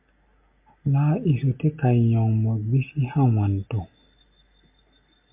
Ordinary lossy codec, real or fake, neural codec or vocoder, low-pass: MP3, 32 kbps; real; none; 3.6 kHz